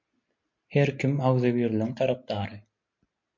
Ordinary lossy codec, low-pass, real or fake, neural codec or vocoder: MP3, 48 kbps; 7.2 kHz; real; none